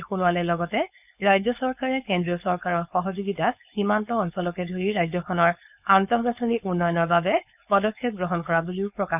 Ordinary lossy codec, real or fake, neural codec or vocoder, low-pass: none; fake; codec, 16 kHz, 4.8 kbps, FACodec; 3.6 kHz